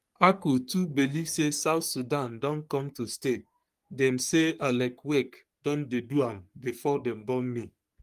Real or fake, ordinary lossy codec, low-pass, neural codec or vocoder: fake; Opus, 32 kbps; 14.4 kHz; codec, 44.1 kHz, 3.4 kbps, Pupu-Codec